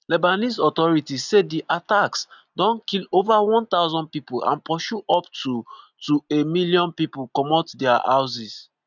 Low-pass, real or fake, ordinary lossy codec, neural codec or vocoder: 7.2 kHz; real; none; none